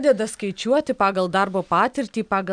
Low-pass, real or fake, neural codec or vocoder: 9.9 kHz; real; none